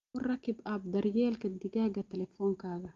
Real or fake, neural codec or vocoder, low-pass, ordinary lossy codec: real; none; 7.2 kHz; Opus, 32 kbps